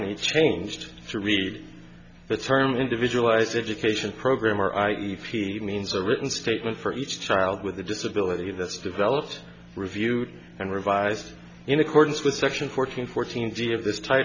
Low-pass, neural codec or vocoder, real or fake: 7.2 kHz; none; real